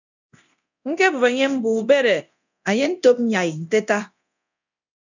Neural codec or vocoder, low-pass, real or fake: codec, 24 kHz, 0.9 kbps, DualCodec; 7.2 kHz; fake